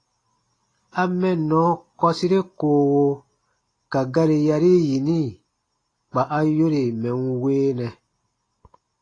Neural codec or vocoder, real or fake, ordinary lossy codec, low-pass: none; real; AAC, 32 kbps; 9.9 kHz